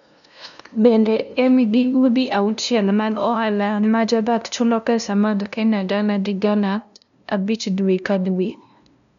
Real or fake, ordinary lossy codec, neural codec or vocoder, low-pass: fake; none; codec, 16 kHz, 0.5 kbps, FunCodec, trained on LibriTTS, 25 frames a second; 7.2 kHz